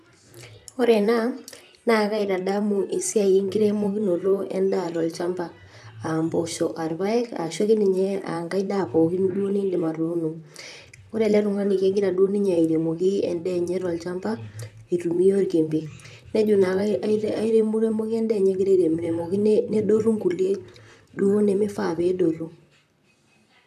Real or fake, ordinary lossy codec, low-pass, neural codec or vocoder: fake; none; 14.4 kHz; vocoder, 44.1 kHz, 128 mel bands, Pupu-Vocoder